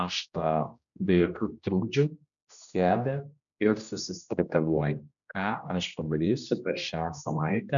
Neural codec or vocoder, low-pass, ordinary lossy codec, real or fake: codec, 16 kHz, 1 kbps, X-Codec, HuBERT features, trained on general audio; 7.2 kHz; MP3, 96 kbps; fake